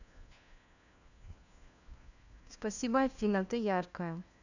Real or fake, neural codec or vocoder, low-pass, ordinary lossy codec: fake; codec, 16 kHz, 1 kbps, FunCodec, trained on LibriTTS, 50 frames a second; 7.2 kHz; none